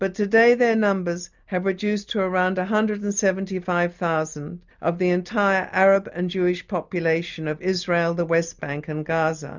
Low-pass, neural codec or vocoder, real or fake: 7.2 kHz; none; real